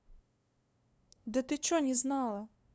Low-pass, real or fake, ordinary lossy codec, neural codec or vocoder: none; fake; none; codec, 16 kHz, 2 kbps, FunCodec, trained on LibriTTS, 25 frames a second